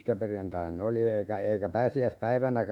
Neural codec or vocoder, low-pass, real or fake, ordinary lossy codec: autoencoder, 48 kHz, 32 numbers a frame, DAC-VAE, trained on Japanese speech; 19.8 kHz; fake; MP3, 96 kbps